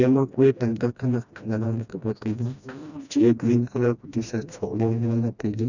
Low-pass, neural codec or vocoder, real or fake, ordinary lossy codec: 7.2 kHz; codec, 16 kHz, 1 kbps, FreqCodec, smaller model; fake; none